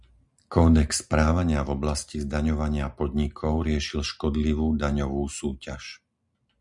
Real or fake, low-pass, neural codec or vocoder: real; 10.8 kHz; none